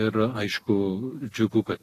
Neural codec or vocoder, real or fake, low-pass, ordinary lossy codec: none; real; 14.4 kHz; AAC, 48 kbps